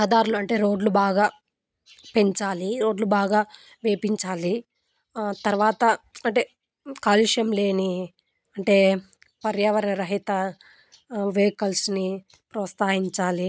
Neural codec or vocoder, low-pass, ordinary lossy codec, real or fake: none; none; none; real